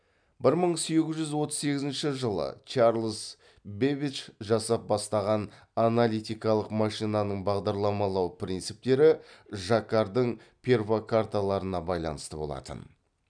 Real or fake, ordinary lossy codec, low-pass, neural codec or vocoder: real; none; 9.9 kHz; none